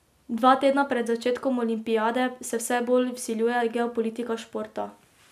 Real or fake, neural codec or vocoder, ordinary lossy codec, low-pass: real; none; none; 14.4 kHz